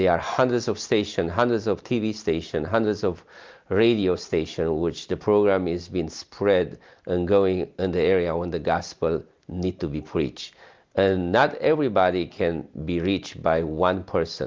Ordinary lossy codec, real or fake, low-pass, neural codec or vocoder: Opus, 32 kbps; real; 7.2 kHz; none